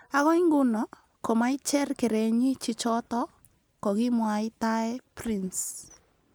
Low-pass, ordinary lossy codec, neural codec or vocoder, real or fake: none; none; none; real